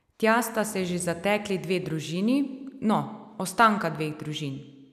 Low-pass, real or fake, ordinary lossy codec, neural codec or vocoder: 14.4 kHz; real; none; none